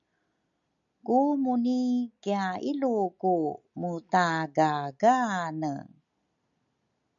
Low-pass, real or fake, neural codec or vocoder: 7.2 kHz; real; none